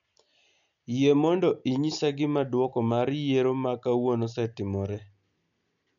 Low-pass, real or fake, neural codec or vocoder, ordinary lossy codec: 7.2 kHz; real; none; none